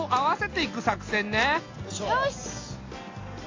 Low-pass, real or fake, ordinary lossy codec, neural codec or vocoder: 7.2 kHz; real; AAC, 32 kbps; none